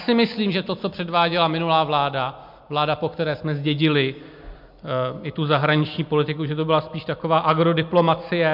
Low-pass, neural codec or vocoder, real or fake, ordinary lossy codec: 5.4 kHz; none; real; MP3, 48 kbps